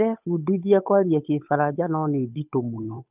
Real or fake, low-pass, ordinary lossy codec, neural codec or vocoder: fake; 3.6 kHz; none; codec, 16 kHz, 8 kbps, FunCodec, trained on Chinese and English, 25 frames a second